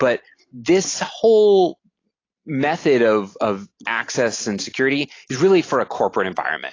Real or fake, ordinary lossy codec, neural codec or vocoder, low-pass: real; AAC, 32 kbps; none; 7.2 kHz